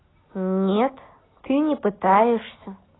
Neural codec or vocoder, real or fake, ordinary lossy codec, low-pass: none; real; AAC, 16 kbps; 7.2 kHz